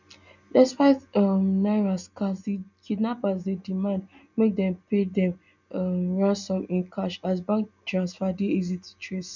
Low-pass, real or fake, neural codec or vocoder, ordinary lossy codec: 7.2 kHz; real; none; none